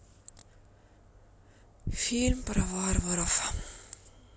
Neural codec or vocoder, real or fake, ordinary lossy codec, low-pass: none; real; none; none